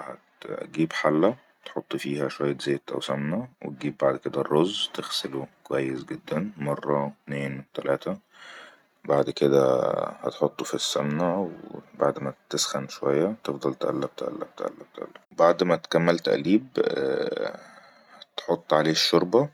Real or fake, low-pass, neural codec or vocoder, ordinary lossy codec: real; 19.8 kHz; none; none